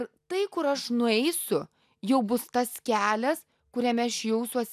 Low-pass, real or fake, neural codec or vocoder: 14.4 kHz; real; none